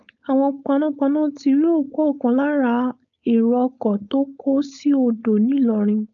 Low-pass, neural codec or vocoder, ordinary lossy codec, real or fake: 7.2 kHz; codec, 16 kHz, 4.8 kbps, FACodec; none; fake